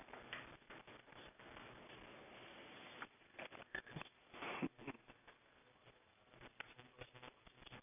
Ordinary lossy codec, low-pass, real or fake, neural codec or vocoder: none; 3.6 kHz; real; none